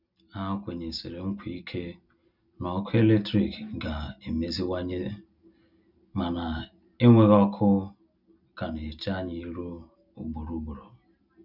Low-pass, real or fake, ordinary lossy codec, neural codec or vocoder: 5.4 kHz; real; none; none